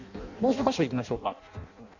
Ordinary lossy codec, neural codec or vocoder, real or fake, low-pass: none; codec, 16 kHz in and 24 kHz out, 0.6 kbps, FireRedTTS-2 codec; fake; 7.2 kHz